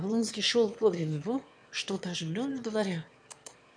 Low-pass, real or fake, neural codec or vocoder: 9.9 kHz; fake; autoencoder, 22.05 kHz, a latent of 192 numbers a frame, VITS, trained on one speaker